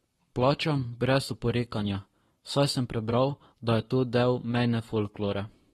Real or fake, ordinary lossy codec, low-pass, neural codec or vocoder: fake; AAC, 32 kbps; 19.8 kHz; codec, 44.1 kHz, 7.8 kbps, Pupu-Codec